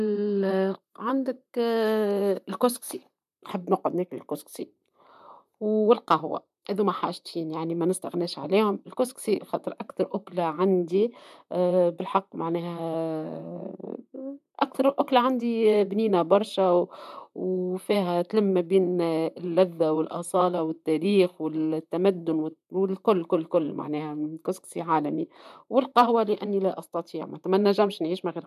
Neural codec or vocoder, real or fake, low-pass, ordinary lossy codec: vocoder, 44.1 kHz, 128 mel bands, Pupu-Vocoder; fake; 14.4 kHz; none